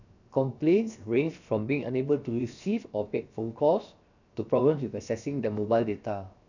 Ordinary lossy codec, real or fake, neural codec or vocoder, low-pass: none; fake; codec, 16 kHz, 0.7 kbps, FocalCodec; 7.2 kHz